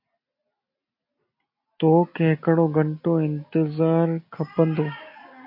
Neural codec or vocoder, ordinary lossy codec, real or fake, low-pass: none; MP3, 32 kbps; real; 5.4 kHz